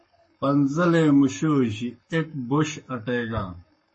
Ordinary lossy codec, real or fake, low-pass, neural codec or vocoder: MP3, 32 kbps; fake; 10.8 kHz; codec, 44.1 kHz, 7.8 kbps, Pupu-Codec